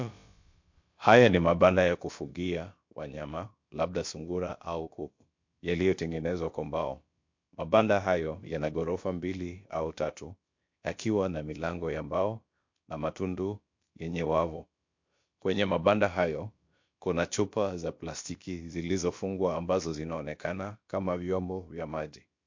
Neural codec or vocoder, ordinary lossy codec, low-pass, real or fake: codec, 16 kHz, about 1 kbps, DyCAST, with the encoder's durations; MP3, 48 kbps; 7.2 kHz; fake